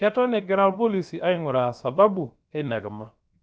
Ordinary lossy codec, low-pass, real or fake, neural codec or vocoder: none; none; fake; codec, 16 kHz, 0.7 kbps, FocalCodec